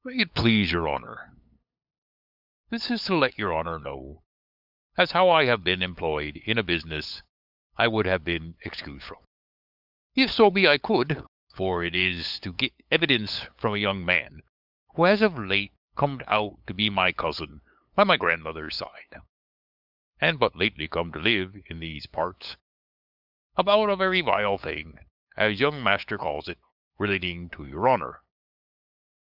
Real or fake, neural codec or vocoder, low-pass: fake; codec, 16 kHz, 8 kbps, FunCodec, trained on LibriTTS, 25 frames a second; 5.4 kHz